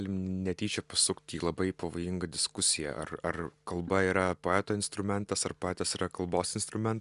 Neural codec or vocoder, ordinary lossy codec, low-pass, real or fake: none; Opus, 64 kbps; 10.8 kHz; real